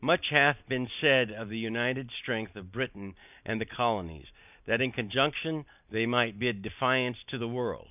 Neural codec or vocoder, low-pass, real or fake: none; 3.6 kHz; real